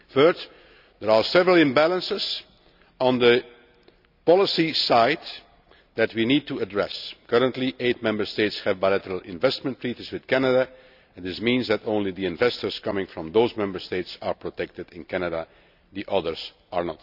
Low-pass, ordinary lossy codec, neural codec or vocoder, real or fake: 5.4 kHz; none; none; real